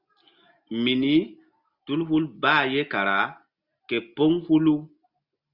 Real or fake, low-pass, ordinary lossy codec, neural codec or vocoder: real; 5.4 kHz; Opus, 64 kbps; none